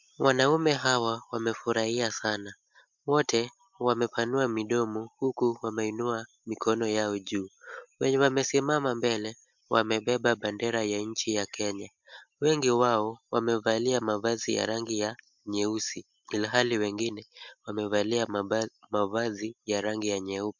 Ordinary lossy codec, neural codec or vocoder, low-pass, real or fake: MP3, 64 kbps; none; 7.2 kHz; real